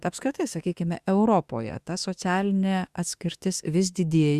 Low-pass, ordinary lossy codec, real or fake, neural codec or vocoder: 14.4 kHz; Opus, 64 kbps; fake; autoencoder, 48 kHz, 32 numbers a frame, DAC-VAE, trained on Japanese speech